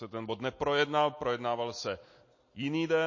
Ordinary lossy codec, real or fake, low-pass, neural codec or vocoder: MP3, 32 kbps; real; 7.2 kHz; none